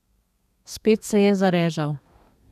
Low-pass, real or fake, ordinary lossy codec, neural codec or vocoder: 14.4 kHz; fake; none; codec, 32 kHz, 1.9 kbps, SNAC